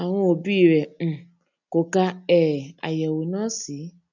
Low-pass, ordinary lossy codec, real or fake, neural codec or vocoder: 7.2 kHz; none; real; none